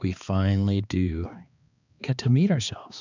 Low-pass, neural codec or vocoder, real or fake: 7.2 kHz; codec, 16 kHz, 2 kbps, X-Codec, HuBERT features, trained on balanced general audio; fake